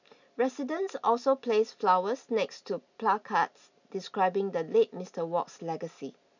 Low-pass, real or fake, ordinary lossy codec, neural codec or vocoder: 7.2 kHz; real; none; none